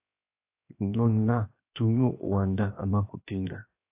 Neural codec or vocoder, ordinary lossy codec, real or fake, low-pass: codec, 16 kHz, 0.7 kbps, FocalCodec; AAC, 24 kbps; fake; 3.6 kHz